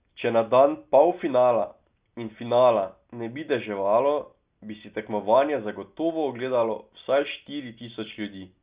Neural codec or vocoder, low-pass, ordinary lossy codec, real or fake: none; 3.6 kHz; Opus, 64 kbps; real